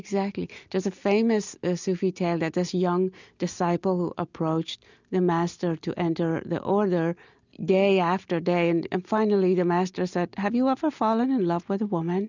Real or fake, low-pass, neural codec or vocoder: real; 7.2 kHz; none